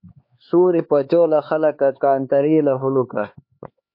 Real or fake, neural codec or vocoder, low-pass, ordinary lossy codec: fake; codec, 16 kHz, 4 kbps, X-Codec, HuBERT features, trained on LibriSpeech; 5.4 kHz; MP3, 32 kbps